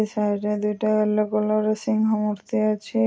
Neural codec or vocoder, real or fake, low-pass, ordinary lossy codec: none; real; none; none